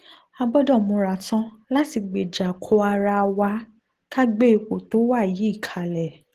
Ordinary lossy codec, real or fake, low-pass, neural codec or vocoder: Opus, 16 kbps; real; 14.4 kHz; none